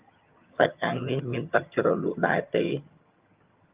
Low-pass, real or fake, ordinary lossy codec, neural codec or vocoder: 3.6 kHz; fake; Opus, 24 kbps; vocoder, 22.05 kHz, 80 mel bands, HiFi-GAN